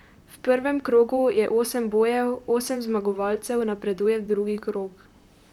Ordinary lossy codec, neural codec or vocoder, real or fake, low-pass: none; vocoder, 44.1 kHz, 128 mel bands, Pupu-Vocoder; fake; 19.8 kHz